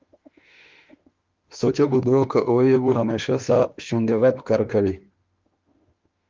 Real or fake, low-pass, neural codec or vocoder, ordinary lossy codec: fake; 7.2 kHz; autoencoder, 48 kHz, 32 numbers a frame, DAC-VAE, trained on Japanese speech; Opus, 24 kbps